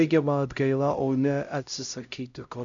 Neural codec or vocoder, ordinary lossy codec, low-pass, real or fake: codec, 16 kHz, 0.5 kbps, X-Codec, HuBERT features, trained on LibriSpeech; MP3, 64 kbps; 7.2 kHz; fake